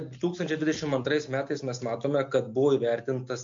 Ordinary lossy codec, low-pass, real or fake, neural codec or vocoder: MP3, 48 kbps; 7.2 kHz; real; none